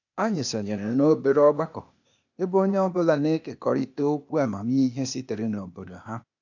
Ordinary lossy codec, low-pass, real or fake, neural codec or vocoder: none; 7.2 kHz; fake; codec, 16 kHz, 0.8 kbps, ZipCodec